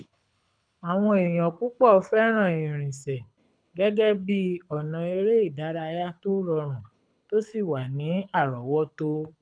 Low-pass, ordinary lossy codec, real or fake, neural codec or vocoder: 9.9 kHz; none; fake; codec, 24 kHz, 6 kbps, HILCodec